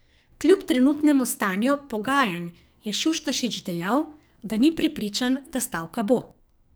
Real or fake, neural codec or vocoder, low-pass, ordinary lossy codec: fake; codec, 44.1 kHz, 2.6 kbps, SNAC; none; none